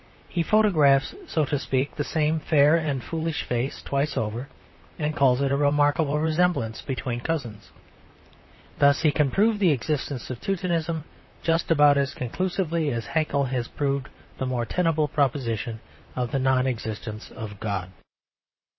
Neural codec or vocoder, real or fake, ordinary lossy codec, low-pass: vocoder, 44.1 kHz, 128 mel bands, Pupu-Vocoder; fake; MP3, 24 kbps; 7.2 kHz